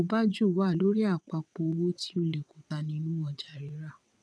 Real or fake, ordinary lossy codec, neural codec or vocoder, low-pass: fake; none; vocoder, 22.05 kHz, 80 mel bands, WaveNeXt; none